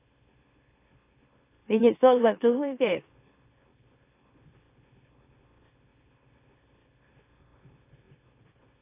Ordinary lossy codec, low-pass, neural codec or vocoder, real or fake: AAC, 24 kbps; 3.6 kHz; autoencoder, 44.1 kHz, a latent of 192 numbers a frame, MeloTTS; fake